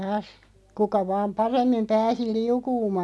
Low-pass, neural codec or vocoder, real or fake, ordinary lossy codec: none; none; real; none